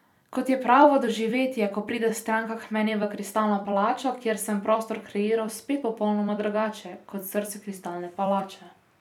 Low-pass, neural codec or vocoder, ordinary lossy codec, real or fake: 19.8 kHz; vocoder, 44.1 kHz, 128 mel bands every 256 samples, BigVGAN v2; none; fake